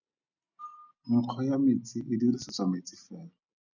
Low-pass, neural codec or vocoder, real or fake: 7.2 kHz; none; real